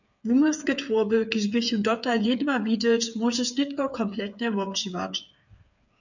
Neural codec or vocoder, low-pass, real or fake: codec, 16 kHz, 8 kbps, FreqCodec, smaller model; 7.2 kHz; fake